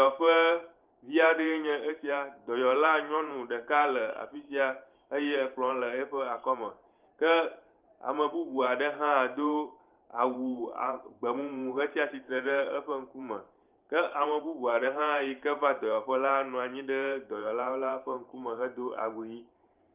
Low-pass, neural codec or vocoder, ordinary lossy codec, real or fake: 3.6 kHz; vocoder, 44.1 kHz, 128 mel bands every 512 samples, BigVGAN v2; Opus, 24 kbps; fake